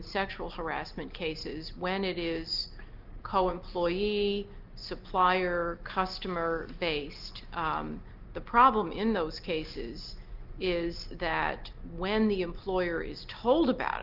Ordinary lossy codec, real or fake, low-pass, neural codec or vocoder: Opus, 24 kbps; real; 5.4 kHz; none